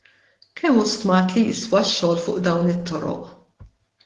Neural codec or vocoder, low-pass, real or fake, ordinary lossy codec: autoencoder, 48 kHz, 128 numbers a frame, DAC-VAE, trained on Japanese speech; 10.8 kHz; fake; Opus, 16 kbps